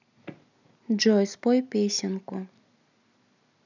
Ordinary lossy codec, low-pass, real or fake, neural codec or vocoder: none; 7.2 kHz; real; none